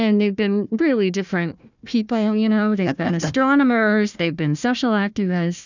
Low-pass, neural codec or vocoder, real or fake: 7.2 kHz; codec, 16 kHz, 1 kbps, FunCodec, trained on Chinese and English, 50 frames a second; fake